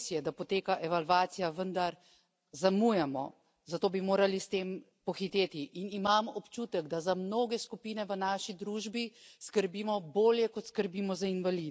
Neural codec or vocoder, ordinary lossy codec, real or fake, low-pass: none; none; real; none